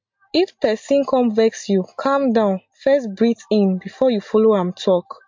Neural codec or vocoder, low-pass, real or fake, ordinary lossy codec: none; 7.2 kHz; real; MP3, 48 kbps